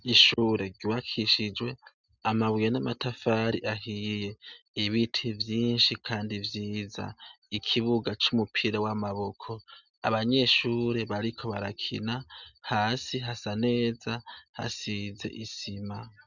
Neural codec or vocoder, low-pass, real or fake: none; 7.2 kHz; real